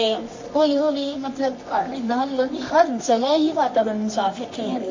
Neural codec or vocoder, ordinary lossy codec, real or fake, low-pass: codec, 24 kHz, 0.9 kbps, WavTokenizer, medium music audio release; MP3, 32 kbps; fake; 7.2 kHz